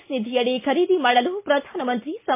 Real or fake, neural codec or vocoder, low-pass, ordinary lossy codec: real; none; 3.6 kHz; MP3, 24 kbps